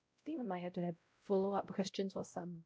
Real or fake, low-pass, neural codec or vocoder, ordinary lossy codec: fake; none; codec, 16 kHz, 0.5 kbps, X-Codec, WavLM features, trained on Multilingual LibriSpeech; none